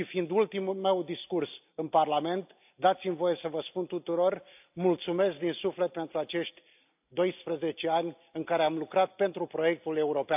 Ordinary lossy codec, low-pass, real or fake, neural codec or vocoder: AAC, 32 kbps; 3.6 kHz; real; none